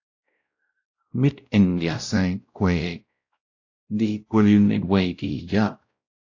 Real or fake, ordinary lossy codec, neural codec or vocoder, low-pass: fake; AAC, 48 kbps; codec, 16 kHz, 0.5 kbps, X-Codec, WavLM features, trained on Multilingual LibriSpeech; 7.2 kHz